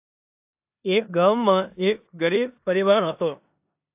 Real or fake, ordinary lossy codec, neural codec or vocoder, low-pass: fake; AAC, 32 kbps; codec, 16 kHz in and 24 kHz out, 0.9 kbps, LongCat-Audio-Codec, four codebook decoder; 3.6 kHz